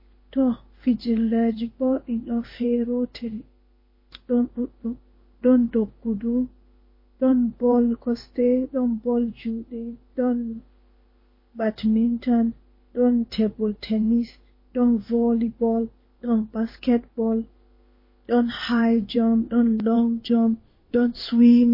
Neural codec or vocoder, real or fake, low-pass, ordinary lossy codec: codec, 16 kHz in and 24 kHz out, 1 kbps, XY-Tokenizer; fake; 5.4 kHz; MP3, 24 kbps